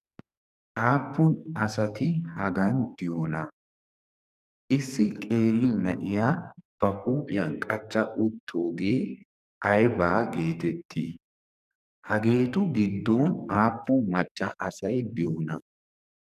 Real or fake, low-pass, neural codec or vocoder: fake; 14.4 kHz; codec, 44.1 kHz, 2.6 kbps, SNAC